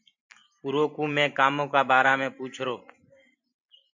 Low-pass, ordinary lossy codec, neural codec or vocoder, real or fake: 7.2 kHz; MP3, 64 kbps; vocoder, 44.1 kHz, 128 mel bands every 512 samples, BigVGAN v2; fake